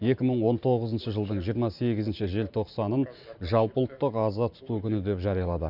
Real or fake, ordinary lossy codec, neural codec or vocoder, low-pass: fake; none; vocoder, 44.1 kHz, 128 mel bands every 512 samples, BigVGAN v2; 5.4 kHz